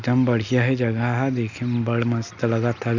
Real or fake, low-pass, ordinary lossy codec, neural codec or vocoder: real; 7.2 kHz; none; none